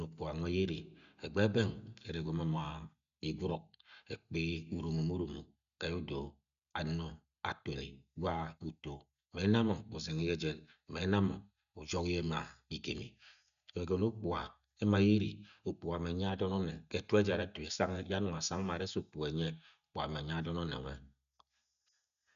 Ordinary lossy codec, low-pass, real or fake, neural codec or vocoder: none; 7.2 kHz; real; none